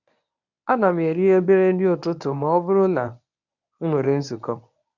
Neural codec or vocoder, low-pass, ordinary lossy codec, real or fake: codec, 24 kHz, 0.9 kbps, WavTokenizer, medium speech release version 1; 7.2 kHz; none; fake